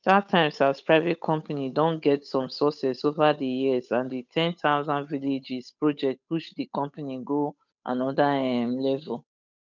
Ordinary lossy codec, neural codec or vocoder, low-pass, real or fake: none; codec, 16 kHz, 8 kbps, FunCodec, trained on Chinese and English, 25 frames a second; 7.2 kHz; fake